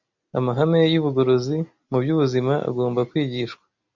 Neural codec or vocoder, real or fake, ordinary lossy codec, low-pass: none; real; MP3, 64 kbps; 7.2 kHz